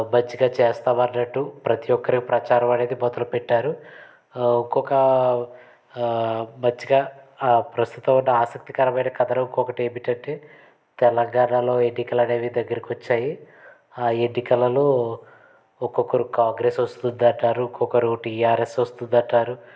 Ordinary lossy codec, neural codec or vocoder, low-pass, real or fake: none; none; none; real